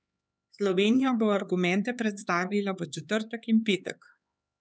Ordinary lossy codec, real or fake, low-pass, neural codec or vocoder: none; fake; none; codec, 16 kHz, 4 kbps, X-Codec, HuBERT features, trained on LibriSpeech